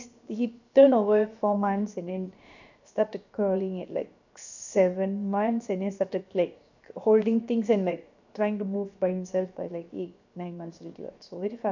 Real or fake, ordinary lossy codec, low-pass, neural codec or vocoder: fake; none; 7.2 kHz; codec, 16 kHz, 0.7 kbps, FocalCodec